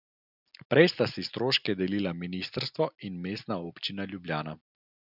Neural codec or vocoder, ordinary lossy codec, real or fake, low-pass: none; none; real; 5.4 kHz